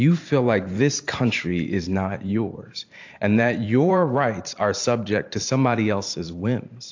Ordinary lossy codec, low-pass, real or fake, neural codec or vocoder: AAC, 48 kbps; 7.2 kHz; fake; vocoder, 44.1 kHz, 128 mel bands every 512 samples, BigVGAN v2